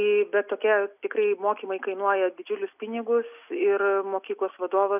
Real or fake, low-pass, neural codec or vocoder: real; 3.6 kHz; none